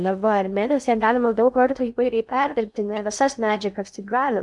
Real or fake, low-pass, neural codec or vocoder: fake; 10.8 kHz; codec, 16 kHz in and 24 kHz out, 0.6 kbps, FocalCodec, streaming, 2048 codes